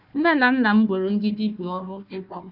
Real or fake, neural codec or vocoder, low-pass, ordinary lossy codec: fake; codec, 16 kHz, 1 kbps, FunCodec, trained on Chinese and English, 50 frames a second; 5.4 kHz; none